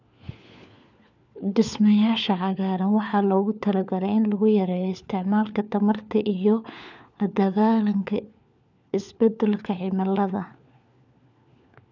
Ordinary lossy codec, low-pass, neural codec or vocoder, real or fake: none; 7.2 kHz; codec, 16 kHz, 4 kbps, FunCodec, trained on LibriTTS, 50 frames a second; fake